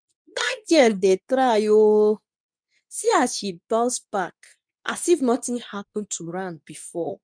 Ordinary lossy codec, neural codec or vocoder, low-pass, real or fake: none; codec, 24 kHz, 0.9 kbps, WavTokenizer, medium speech release version 2; 9.9 kHz; fake